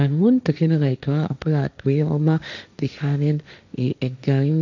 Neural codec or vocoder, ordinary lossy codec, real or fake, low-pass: codec, 16 kHz, 1.1 kbps, Voila-Tokenizer; none; fake; 7.2 kHz